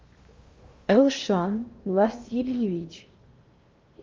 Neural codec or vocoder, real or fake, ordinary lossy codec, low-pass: codec, 16 kHz in and 24 kHz out, 0.8 kbps, FocalCodec, streaming, 65536 codes; fake; Opus, 32 kbps; 7.2 kHz